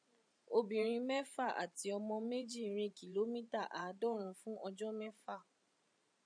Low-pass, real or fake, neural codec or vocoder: 9.9 kHz; fake; vocoder, 44.1 kHz, 128 mel bands every 512 samples, BigVGAN v2